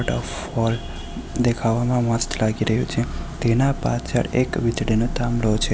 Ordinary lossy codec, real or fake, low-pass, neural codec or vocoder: none; real; none; none